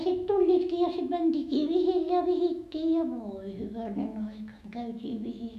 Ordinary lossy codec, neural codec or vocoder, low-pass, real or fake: none; autoencoder, 48 kHz, 128 numbers a frame, DAC-VAE, trained on Japanese speech; 14.4 kHz; fake